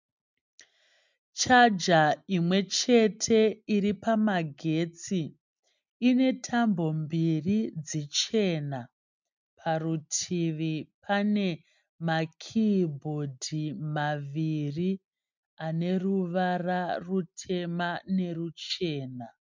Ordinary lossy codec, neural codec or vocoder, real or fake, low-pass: MP3, 64 kbps; none; real; 7.2 kHz